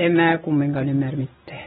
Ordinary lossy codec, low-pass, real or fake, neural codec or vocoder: AAC, 16 kbps; 7.2 kHz; real; none